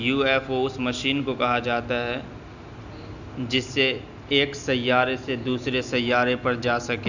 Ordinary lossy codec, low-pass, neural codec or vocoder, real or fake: none; 7.2 kHz; none; real